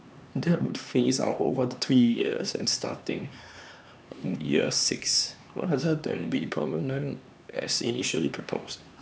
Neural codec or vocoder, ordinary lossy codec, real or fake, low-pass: codec, 16 kHz, 2 kbps, X-Codec, HuBERT features, trained on LibriSpeech; none; fake; none